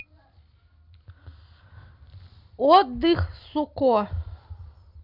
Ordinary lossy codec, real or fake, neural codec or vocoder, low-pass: none; real; none; 5.4 kHz